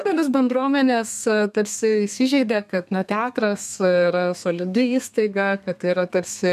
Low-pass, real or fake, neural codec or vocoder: 14.4 kHz; fake; codec, 32 kHz, 1.9 kbps, SNAC